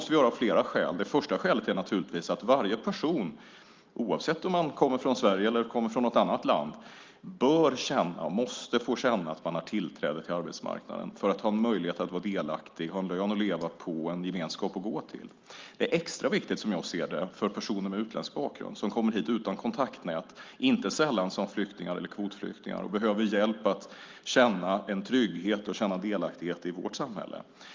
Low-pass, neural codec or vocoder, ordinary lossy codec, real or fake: 7.2 kHz; none; Opus, 24 kbps; real